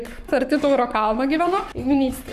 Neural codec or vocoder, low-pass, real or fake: codec, 44.1 kHz, 7.8 kbps, Pupu-Codec; 14.4 kHz; fake